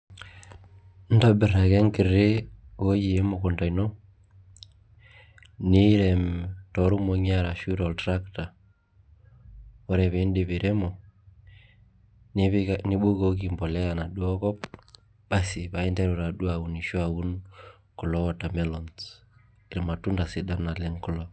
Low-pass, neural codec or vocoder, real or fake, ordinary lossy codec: none; none; real; none